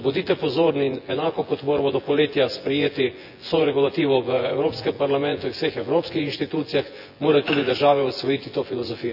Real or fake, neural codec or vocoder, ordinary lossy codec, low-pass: fake; vocoder, 24 kHz, 100 mel bands, Vocos; none; 5.4 kHz